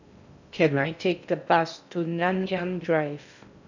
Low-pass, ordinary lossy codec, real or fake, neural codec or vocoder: 7.2 kHz; none; fake; codec, 16 kHz in and 24 kHz out, 0.6 kbps, FocalCodec, streaming, 2048 codes